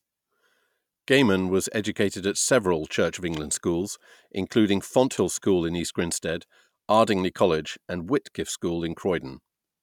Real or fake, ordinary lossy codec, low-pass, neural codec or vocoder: real; none; 19.8 kHz; none